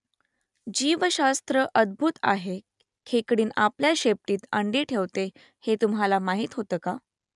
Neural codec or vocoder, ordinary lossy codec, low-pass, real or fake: none; none; 10.8 kHz; real